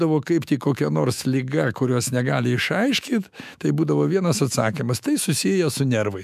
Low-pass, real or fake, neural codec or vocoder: 14.4 kHz; fake; autoencoder, 48 kHz, 128 numbers a frame, DAC-VAE, trained on Japanese speech